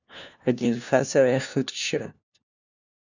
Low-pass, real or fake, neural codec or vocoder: 7.2 kHz; fake; codec, 16 kHz, 1 kbps, FunCodec, trained on LibriTTS, 50 frames a second